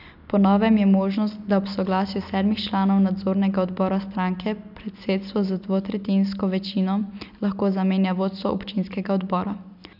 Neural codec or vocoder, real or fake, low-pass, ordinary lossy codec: none; real; 5.4 kHz; none